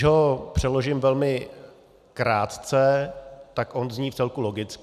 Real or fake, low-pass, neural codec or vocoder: real; 14.4 kHz; none